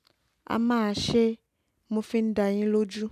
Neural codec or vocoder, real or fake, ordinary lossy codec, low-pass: none; real; none; 14.4 kHz